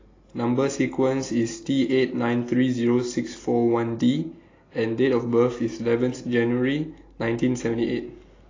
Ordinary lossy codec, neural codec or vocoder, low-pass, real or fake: AAC, 32 kbps; none; 7.2 kHz; real